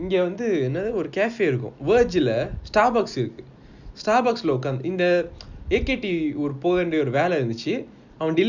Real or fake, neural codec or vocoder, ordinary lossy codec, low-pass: real; none; none; 7.2 kHz